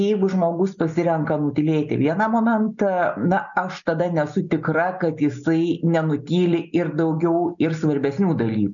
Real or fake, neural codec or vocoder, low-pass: real; none; 7.2 kHz